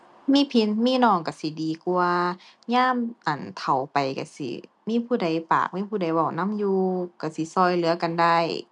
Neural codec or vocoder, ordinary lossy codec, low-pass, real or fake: none; none; 10.8 kHz; real